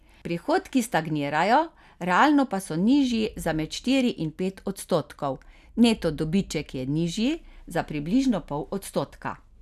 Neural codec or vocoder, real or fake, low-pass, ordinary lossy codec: none; real; 14.4 kHz; none